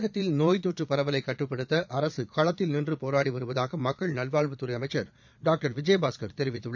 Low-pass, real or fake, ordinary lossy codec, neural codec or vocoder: 7.2 kHz; fake; none; vocoder, 22.05 kHz, 80 mel bands, Vocos